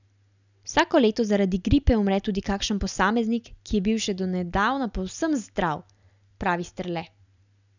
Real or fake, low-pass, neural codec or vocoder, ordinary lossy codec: real; 7.2 kHz; none; none